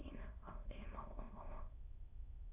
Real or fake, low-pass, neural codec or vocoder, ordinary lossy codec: fake; 3.6 kHz; autoencoder, 22.05 kHz, a latent of 192 numbers a frame, VITS, trained on many speakers; AAC, 24 kbps